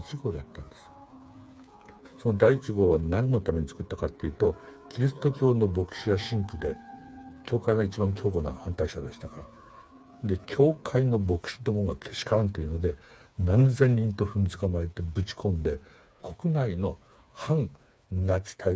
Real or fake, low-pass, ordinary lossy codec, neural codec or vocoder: fake; none; none; codec, 16 kHz, 4 kbps, FreqCodec, smaller model